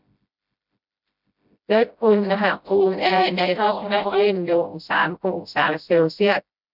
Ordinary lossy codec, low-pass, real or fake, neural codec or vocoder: none; 5.4 kHz; fake; codec, 16 kHz, 0.5 kbps, FreqCodec, smaller model